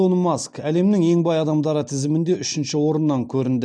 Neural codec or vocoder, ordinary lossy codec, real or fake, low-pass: none; none; real; 9.9 kHz